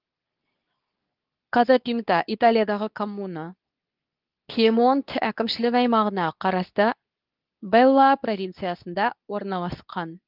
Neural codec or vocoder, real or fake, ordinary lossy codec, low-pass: codec, 24 kHz, 0.9 kbps, WavTokenizer, medium speech release version 2; fake; Opus, 24 kbps; 5.4 kHz